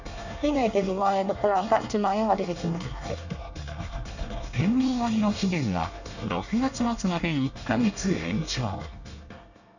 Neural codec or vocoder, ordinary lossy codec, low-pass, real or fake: codec, 24 kHz, 1 kbps, SNAC; none; 7.2 kHz; fake